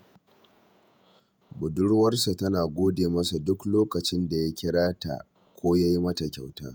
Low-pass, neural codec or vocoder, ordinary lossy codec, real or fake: none; vocoder, 48 kHz, 128 mel bands, Vocos; none; fake